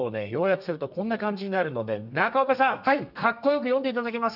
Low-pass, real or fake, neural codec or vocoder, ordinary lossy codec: 5.4 kHz; fake; codec, 32 kHz, 1.9 kbps, SNAC; none